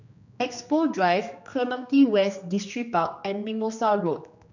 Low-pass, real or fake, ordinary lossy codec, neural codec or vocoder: 7.2 kHz; fake; none; codec, 16 kHz, 2 kbps, X-Codec, HuBERT features, trained on general audio